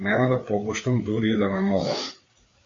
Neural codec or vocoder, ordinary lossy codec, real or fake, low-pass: codec, 16 kHz, 4 kbps, FreqCodec, larger model; AAC, 32 kbps; fake; 7.2 kHz